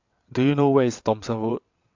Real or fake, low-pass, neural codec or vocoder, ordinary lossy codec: fake; 7.2 kHz; vocoder, 22.05 kHz, 80 mel bands, WaveNeXt; AAC, 48 kbps